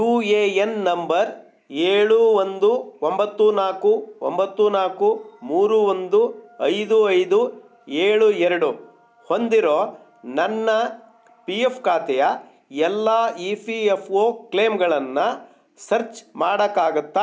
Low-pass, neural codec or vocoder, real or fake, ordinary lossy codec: none; none; real; none